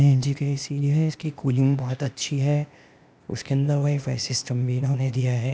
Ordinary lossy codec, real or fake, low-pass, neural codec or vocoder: none; fake; none; codec, 16 kHz, 0.8 kbps, ZipCodec